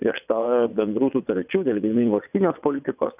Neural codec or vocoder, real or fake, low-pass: vocoder, 22.05 kHz, 80 mel bands, WaveNeXt; fake; 3.6 kHz